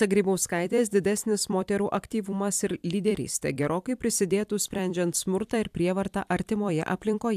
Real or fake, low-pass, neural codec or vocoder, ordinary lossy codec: fake; 14.4 kHz; vocoder, 44.1 kHz, 128 mel bands every 256 samples, BigVGAN v2; AAC, 96 kbps